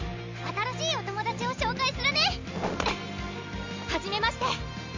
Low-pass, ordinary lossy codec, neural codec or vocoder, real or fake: 7.2 kHz; MP3, 64 kbps; none; real